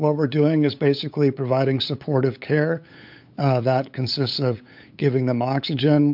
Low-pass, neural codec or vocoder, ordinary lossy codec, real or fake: 5.4 kHz; codec, 16 kHz, 8 kbps, FunCodec, trained on LibriTTS, 25 frames a second; MP3, 48 kbps; fake